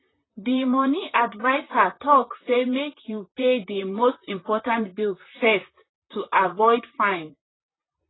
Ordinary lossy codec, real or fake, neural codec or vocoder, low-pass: AAC, 16 kbps; fake; vocoder, 44.1 kHz, 128 mel bands, Pupu-Vocoder; 7.2 kHz